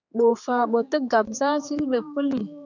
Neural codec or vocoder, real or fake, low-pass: codec, 16 kHz, 4 kbps, X-Codec, HuBERT features, trained on general audio; fake; 7.2 kHz